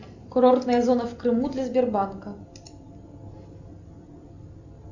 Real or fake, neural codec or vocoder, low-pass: real; none; 7.2 kHz